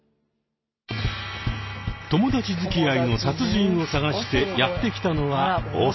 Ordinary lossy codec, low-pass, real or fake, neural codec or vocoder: MP3, 24 kbps; 7.2 kHz; real; none